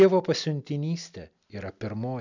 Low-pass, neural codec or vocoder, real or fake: 7.2 kHz; none; real